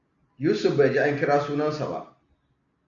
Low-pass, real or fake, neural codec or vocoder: 7.2 kHz; real; none